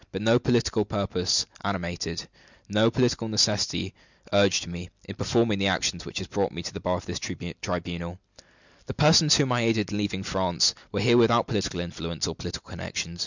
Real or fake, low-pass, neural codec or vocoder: real; 7.2 kHz; none